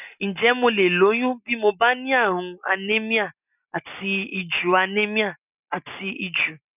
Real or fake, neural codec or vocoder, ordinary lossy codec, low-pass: real; none; none; 3.6 kHz